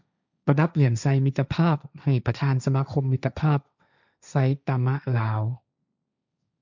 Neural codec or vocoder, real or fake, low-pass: codec, 16 kHz, 1.1 kbps, Voila-Tokenizer; fake; 7.2 kHz